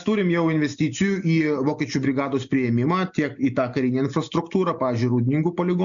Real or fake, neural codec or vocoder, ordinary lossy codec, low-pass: real; none; MP3, 64 kbps; 7.2 kHz